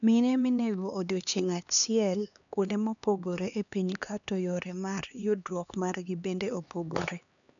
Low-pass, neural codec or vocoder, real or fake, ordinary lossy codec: 7.2 kHz; codec, 16 kHz, 2 kbps, X-Codec, HuBERT features, trained on LibriSpeech; fake; none